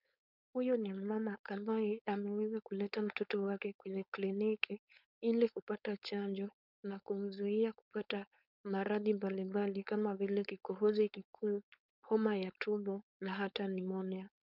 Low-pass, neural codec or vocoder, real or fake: 5.4 kHz; codec, 16 kHz, 4.8 kbps, FACodec; fake